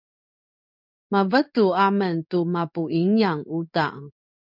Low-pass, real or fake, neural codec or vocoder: 5.4 kHz; fake; codec, 16 kHz in and 24 kHz out, 1 kbps, XY-Tokenizer